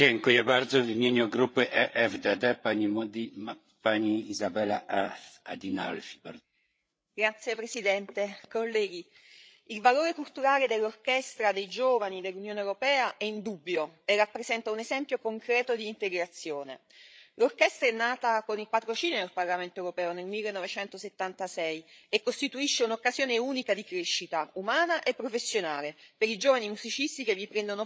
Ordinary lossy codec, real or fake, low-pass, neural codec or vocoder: none; fake; none; codec, 16 kHz, 8 kbps, FreqCodec, larger model